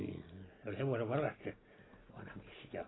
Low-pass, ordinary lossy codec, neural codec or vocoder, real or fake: 7.2 kHz; AAC, 16 kbps; none; real